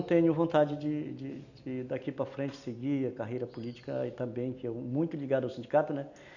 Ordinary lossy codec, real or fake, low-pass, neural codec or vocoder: none; real; 7.2 kHz; none